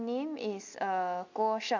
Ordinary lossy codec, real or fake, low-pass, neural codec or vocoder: MP3, 64 kbps; real; 7.2 kHz; none